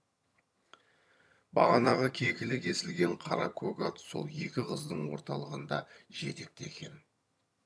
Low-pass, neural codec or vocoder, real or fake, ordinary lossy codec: none; vocoder, 22.05 kHz, 80 mel bands, HiFi-GAN; fake; none